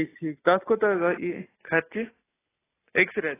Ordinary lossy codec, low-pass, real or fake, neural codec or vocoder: AAC, 16 kbps; 3.6 kHz; real; none